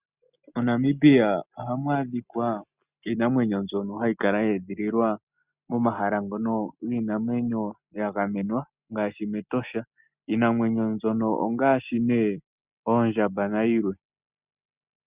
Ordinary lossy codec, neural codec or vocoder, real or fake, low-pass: Opus, 64 kbps; none; real; 3.6 kHz